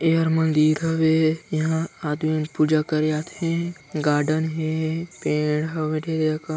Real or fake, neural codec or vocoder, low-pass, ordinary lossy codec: real; none; none; none